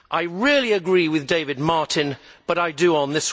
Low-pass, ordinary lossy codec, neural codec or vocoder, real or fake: none; none; none; real